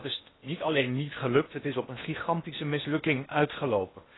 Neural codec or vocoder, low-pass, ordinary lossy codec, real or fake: codec, 16 kHz in and 24 kHz out, 0.8 kbps, FocalCodec, streaming, 65536 codes; 7.2 kHz; AAC, 16 kbps; fake